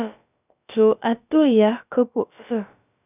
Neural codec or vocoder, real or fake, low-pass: codec, 16 kHz, about 1 kbps, DyCAST, with the encoder's durations; fake; 3.6 kHz